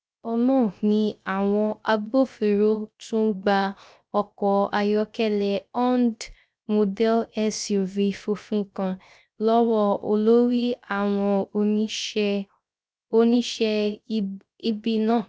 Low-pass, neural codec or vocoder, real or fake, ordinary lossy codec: none; codec, 16 kHz, 0.3 kbps, FocalCodec; fake; none